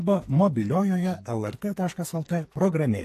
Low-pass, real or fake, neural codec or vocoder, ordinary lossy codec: 14.4 kHz; fake; codec, 44.1 kHz, 2.6 kbps, SNAC; AAC, 64 kbps